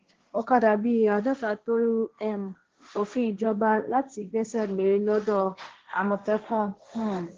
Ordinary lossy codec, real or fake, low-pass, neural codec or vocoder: Opus, 16 kbps; fake; 7.2 kHz; codec, 16 kHz, 1.1 kbps, Voila-Tokenizer